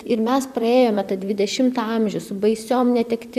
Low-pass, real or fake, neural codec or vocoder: 14.4 kHz; fake; vocoder, 44.1 kHz, 128 mel bands, Pupu-Vocoder